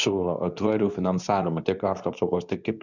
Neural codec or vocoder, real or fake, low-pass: codec, 24 kHz, 0.9 kbps, WavTokenizer, medium speech release version 2; fake; 7.2 kHz